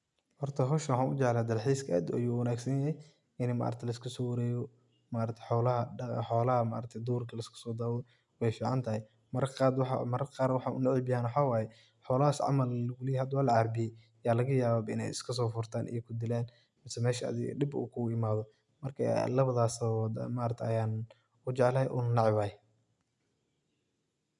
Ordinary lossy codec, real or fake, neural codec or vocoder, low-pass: none; real; none; 10.8 kHz